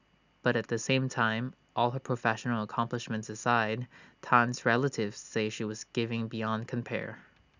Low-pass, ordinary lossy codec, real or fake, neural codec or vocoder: 7.2 kHz; none; real; none